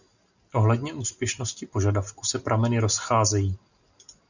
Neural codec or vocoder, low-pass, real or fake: none; 7.2 kHz; real